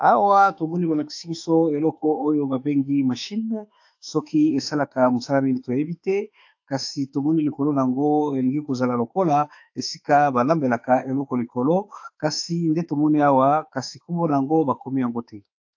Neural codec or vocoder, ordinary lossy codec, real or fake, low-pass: autoencoder, 48 kHz, 32 numbers a frame, DAC-VAE, trained on Japanese speech; AAC, 48 kbps; fake; 7.2 kHz